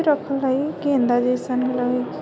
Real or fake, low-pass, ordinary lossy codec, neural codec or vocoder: real; none; none; none